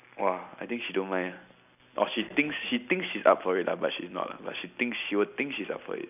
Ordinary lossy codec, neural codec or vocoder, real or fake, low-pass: none; none; real; 3.6 kHz